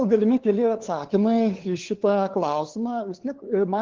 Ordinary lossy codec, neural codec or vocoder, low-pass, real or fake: Opus, 16 kbps; codec, 16 kHz, 2 kbps, FunCodec, trained on LibriTTS, 25 frames a second; 7.2 kHz; fake